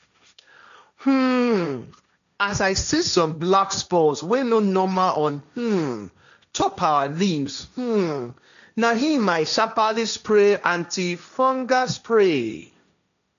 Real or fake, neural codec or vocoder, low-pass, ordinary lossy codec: fake; codec, 16 kHz, 1.1 kbps, Voila-Tokenizer; 7.2 kHz; none